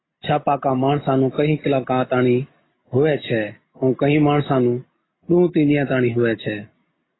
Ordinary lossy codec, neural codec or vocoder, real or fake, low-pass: AAC, 16 kbps; none; real; 7.2 kHz